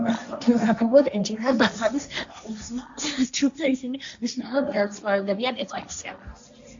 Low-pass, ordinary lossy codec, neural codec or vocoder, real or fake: 7.2 kHz; MP3, 64 kbps; codec, 16 kHz, 1.1 kbps, Voila-Tokenizer; fake